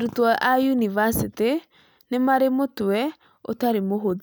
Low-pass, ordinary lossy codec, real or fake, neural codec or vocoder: none; none; real; none